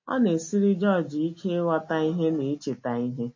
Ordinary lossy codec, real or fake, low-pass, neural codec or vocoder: MP3, 32 kbps; real; 7.2 kHz; none